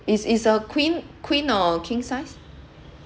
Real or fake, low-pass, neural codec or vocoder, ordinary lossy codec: real; none; none; none